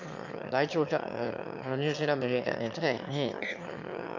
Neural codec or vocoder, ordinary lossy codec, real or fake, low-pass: autoencoder, 22.05 kHz, a latent of 192 numbers a frame, VITS, trained on one speaker; none; fake; 7.2 kHz